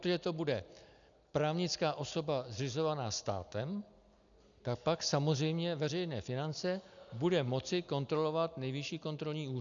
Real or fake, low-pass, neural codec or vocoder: real; 7.2 kHz; none